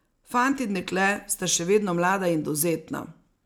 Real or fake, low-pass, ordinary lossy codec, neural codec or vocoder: real; none; none; none